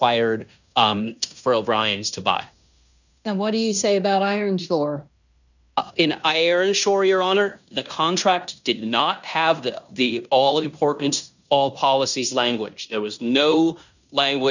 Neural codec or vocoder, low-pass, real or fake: codec, 16 kHz in and 24 kHz out, 0.9 kbps, LongCat-Audio-Codec, fine tuned four codebook decoder; 7.2 kHz; fake